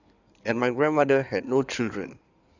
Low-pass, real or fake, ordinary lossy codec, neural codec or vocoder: 7.2 kHz; fake; none; codec, 16 kHz in and 24 kHz out, 2.2 kbps, FireRedTTS-2 codec